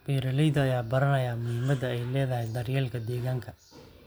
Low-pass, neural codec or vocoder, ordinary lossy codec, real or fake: none; none; none; real